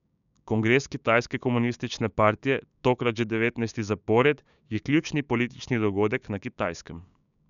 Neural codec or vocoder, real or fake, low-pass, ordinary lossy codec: codec, 16 kHz, 6 kbps, DAC; fake; 7.2 kHz; none